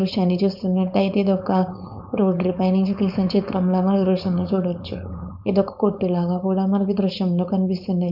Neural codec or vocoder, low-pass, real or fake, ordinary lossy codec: codec, 16 kHz, 4.8 kbps, FACodec; 5.4 kHz; fake; none